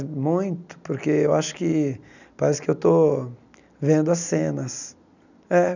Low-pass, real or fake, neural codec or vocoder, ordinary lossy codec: 7.2 kHz; real; none; none